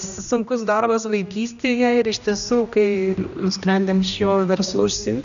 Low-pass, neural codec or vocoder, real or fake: 7.2 kHz; codec, 16 kHz, 1 kbps, X-Codec, HuBERT features, trained on general audio; fake